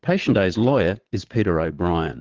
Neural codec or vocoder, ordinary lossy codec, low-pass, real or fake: vocoder, 22.05 kHz, 80 mel bands, Vocos; Opus, 16 kbps; 7.2 kHz; fake